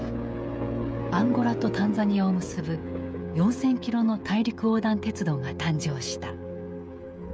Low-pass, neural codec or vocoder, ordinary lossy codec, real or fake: none; codec, 16 kHz, 16 kbps, FreqCodec, smaller model; none; fake